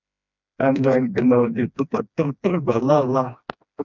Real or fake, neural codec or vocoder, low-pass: fake; codec, 16 kHz, 1 kbps, FreqCodec, smaller model; 7.2 kHz